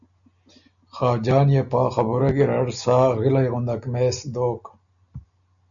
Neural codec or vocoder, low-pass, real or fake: none; 7.2 kHz; real